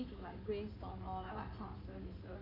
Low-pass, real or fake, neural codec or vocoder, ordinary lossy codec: 5.4 kHz; fake; codec, 16 kHz in and 24 kHz out, 2.2 kbps, FireRedTTS-2 codec; none